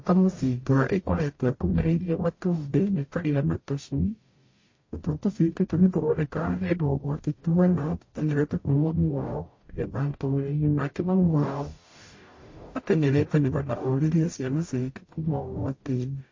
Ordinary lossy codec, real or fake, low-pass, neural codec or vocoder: MP3, 32 kbps; fake; 7.2 kHz; codec, 44.1 kHz, 0.9 kbps, DAC